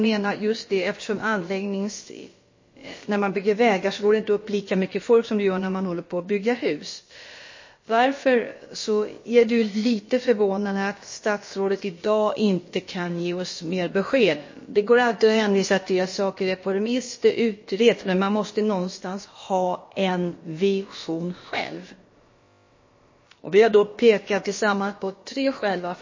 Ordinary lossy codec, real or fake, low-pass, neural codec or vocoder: MP3, 32 kbps; fake; 7.2 kHz; codec, 16 kHz, about 1 kbps, DyCAST, with the encoder's durations